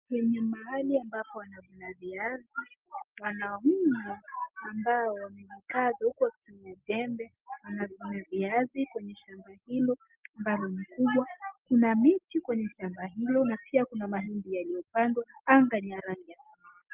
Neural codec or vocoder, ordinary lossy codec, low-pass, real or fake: none; Opus, 32 kbps; 3.6 kHz; real